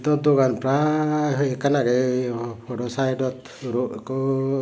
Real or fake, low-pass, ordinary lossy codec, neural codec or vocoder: real; none; none; none